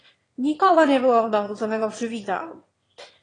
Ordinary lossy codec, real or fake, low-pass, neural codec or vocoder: AAC, 32 kbps; fake; 9.9 kHz; autoencoder, 22.05 kHz, a latent of 192 numbers a frame, VITS, trained on one speaker